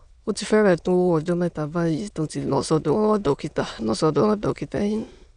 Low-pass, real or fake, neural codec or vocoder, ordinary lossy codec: 9.9 kHz; fake; autoencoder, 22.05 kHz, a latent of 192 numbers a frame, VITS, trained on many speakers; none